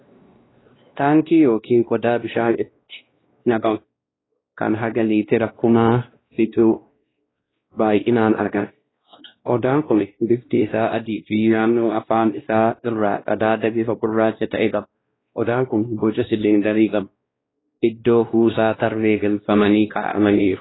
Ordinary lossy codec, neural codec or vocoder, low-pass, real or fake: AAC, 16 kbps; codec, 16 kHz, 1 kbps, X-Codec, WavLM features, trained on Multilingual LibriSpeech; 7.2 kHz; fake